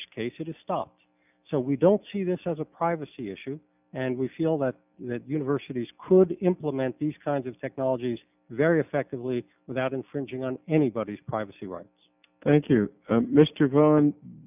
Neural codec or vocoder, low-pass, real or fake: none; 3.6 kHz; real